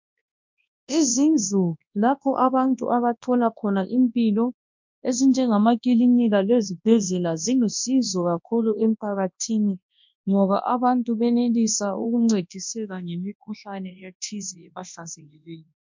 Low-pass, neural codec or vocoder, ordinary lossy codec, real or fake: 7.2 kHz; codec, 24 kHz, 0.9 kbps, WavTokenizer, large speech release; MP3, 48 kbps; fake